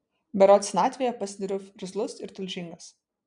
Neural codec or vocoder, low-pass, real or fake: none; 9.9 kHz; real